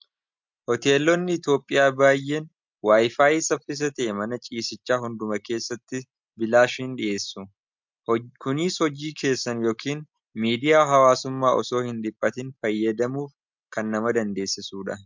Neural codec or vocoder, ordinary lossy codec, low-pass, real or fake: none; MP3, 64 kbps; 7.2 kHz; real